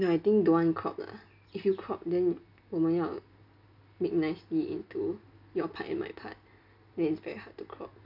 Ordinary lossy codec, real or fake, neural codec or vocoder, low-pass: none; real; none; 5.4 kHz